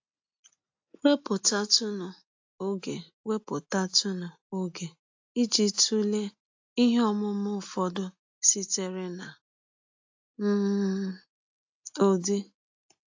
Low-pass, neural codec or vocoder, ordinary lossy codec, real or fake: 7.2 kHz; none; AAC, 48 kbps; real